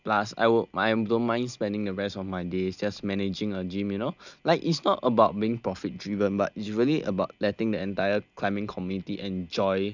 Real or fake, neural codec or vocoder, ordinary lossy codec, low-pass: real; none; none; 7.2 kHz